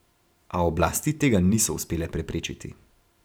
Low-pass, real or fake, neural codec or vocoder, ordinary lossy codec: none; fake; vocoder, 44.1 kHz, 128 mel bands every 512 samples, BigVGAN v2; none